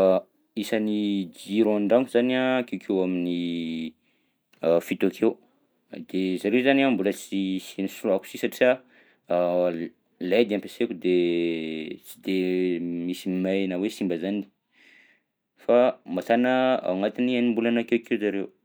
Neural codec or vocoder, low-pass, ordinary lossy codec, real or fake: none; none; none; real